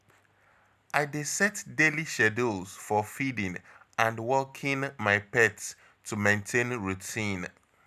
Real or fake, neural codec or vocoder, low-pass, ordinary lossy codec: real; none; 14.4 kHz; none